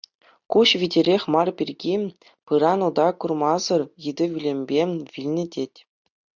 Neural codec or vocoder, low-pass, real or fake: none; 7.2 kHz; real